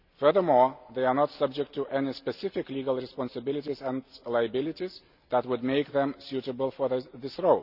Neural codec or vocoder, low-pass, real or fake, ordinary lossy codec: none; 5.4 kHz; real; none